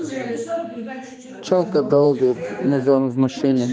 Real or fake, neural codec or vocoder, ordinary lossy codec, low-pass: fake; codec, 16 kHz, 2 kbps, X-Codec, HuBERT features, trained on general audio; none; none